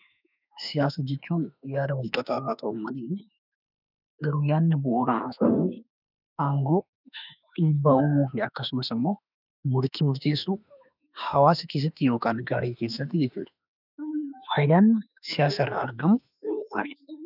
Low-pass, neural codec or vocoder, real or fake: 5.4 kHz; autoencoder, 48 kHz, 32 numbers a frame, DAC-VAE, trained on Japanese speech; fake